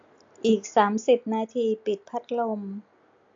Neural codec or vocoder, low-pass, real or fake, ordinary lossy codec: none; 7.2 kHz; real; AAC, 64 kbps